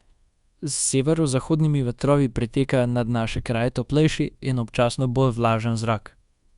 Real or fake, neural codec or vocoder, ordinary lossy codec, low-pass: fake; codec, 24 kHz, 1.2 kbps, DualCodec; Opus, 64 kbps; 10.8 kHz